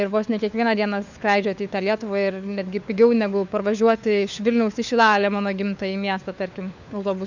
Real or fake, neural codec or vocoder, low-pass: fake; codec, 16 kHz, 4 kbps, FunCodec, trained on LibriTTS, 50 frames a second; 7.2 kHz